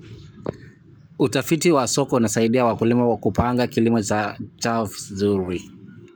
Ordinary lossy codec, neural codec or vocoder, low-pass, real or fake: none; codec, 44.1 kHz, 7.8 kbps, Pupu-Codec; none; fake